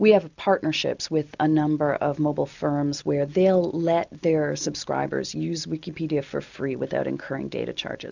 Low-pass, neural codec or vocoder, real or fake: 7.2 kHz; none; real